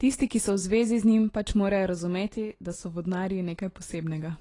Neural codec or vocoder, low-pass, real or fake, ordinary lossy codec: none; 10.8 kHz; real; AAC, 32 kbps